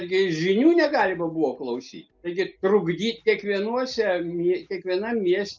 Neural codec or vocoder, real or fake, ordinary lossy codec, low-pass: none; real; Opus, 24 kbps; 7.2 kHz